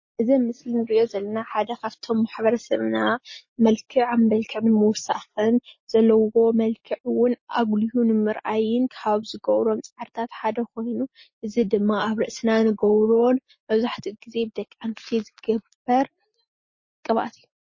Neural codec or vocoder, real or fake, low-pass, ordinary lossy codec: none; real; 7.2 kHz; MP3, 32 kbps